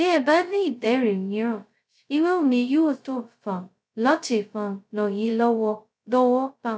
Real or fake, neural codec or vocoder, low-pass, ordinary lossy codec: fake; codec, 16 kHz, 0.2 kbps, FocalCodec; none; none